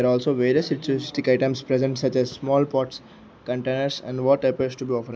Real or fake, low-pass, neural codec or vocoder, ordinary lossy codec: real; none; none; none